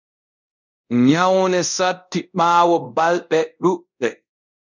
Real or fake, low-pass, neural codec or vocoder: fake; 7.2 kHz; codec, 24 kHz, 0.5 kbps, DualCodec